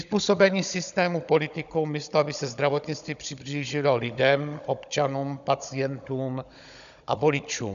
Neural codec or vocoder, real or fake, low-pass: codec, 16 kHz, 16 kbps, FunCodec, trained on Chinese and English, 50 frames a second; fake; 7.2 kHz